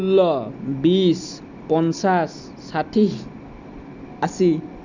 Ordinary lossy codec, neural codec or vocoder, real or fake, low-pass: none; none; real; 7.2 kHz